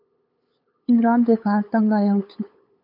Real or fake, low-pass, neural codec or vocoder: fake; 5.4 kHz; codec, 16 kHz, 8 kbps, FunCodec, trained on LibriTTS, 25 frames a second